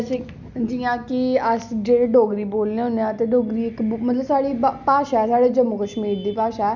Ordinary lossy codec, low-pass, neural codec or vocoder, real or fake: none; 7.2 kHz; none; real